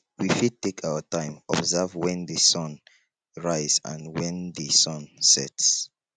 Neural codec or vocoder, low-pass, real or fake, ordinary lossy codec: none; 9.9 kHz; real; none